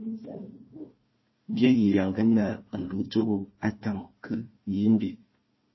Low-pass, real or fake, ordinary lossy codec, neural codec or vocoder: 7.2 kHz; fake; MP3, 24 kbps; codec, 16 kHz, 1 kbps, FunCodec, trained on Chinese and English, 50 frames a second